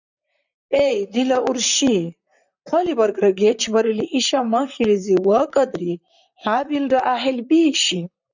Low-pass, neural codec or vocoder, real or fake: 7.2 kHz; vocoder, 44.1 kHz, 128 mel bands, Pupu-Vocoder; fake